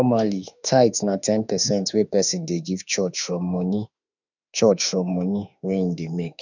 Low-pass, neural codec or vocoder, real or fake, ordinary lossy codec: 7.2 kHz; autoencoder, 48 kHz, 32 numbers a frame, DAC-VAE, trained on Japanese speech; fake; none